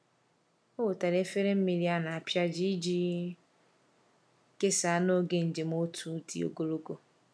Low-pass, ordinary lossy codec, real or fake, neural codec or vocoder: none; none; real; none